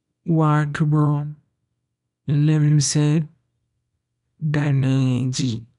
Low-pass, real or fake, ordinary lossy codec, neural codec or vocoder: 10.8 kHz; fake; none; codec, 24 kHz, 0.9 kbps, WavTokenizer, small release